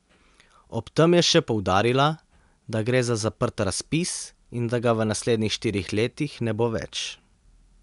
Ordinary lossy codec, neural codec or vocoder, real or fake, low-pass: MP3, 96 kbps; none; real; 10.8 kHz